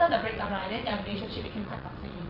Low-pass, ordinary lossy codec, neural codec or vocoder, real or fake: 5.4 kHz; none; vocoder, 22.05 kHz, 80 mel bands, WaveNeXt; fake